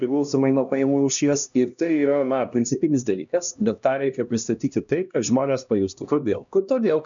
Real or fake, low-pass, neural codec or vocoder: fake; 7.2 kHz; codec, 16 kHz, 1 kbps, X-Codec, HuBERT features, trained on LibriSpeech